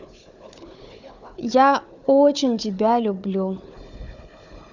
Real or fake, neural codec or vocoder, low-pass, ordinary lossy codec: fake; codec, 16 kHz, 4 kbps, FunCodec, trained on Chinese and English, 50 frames a second; 7.2 kHz; none